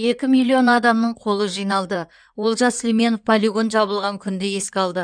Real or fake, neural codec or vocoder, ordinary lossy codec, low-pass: fake; codec, 16 kHz in and 24 kHz out, 2.2 kbps, FireRedTTS-2 codec; none; 9.9 kHz